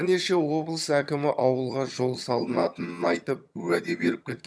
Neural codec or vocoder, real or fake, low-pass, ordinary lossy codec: vocoder, 22.05 kHz, 80 mel bands, HiFi-GAN; fake; none; none